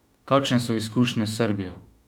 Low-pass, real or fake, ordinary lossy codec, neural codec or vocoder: 19.8 kHz; fake; none; autoencoder, 48 kHz, 32 numbers a frame, DAC-VAE, trained on Japanese speech